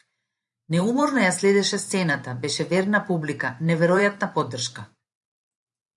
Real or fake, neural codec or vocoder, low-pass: fake; vocoder, 44.1 kHz, 128 mel bands every 512 samples, BigVGAN v2; 10.8 kHz